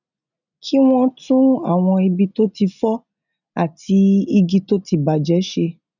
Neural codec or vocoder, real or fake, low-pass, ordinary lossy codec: none; real; 7.2 kHz; none